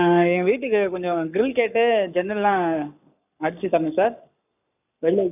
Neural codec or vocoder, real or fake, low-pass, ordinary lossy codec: codec, 44.1 kHz, 7.8 kbps, Pupu-Codec; fake; 3.6 kHz; none